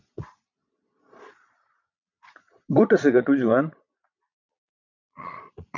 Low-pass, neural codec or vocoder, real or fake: 7.2 kHz; vocoder, 22.05 kHz, 80 mel bands, Vocos; fake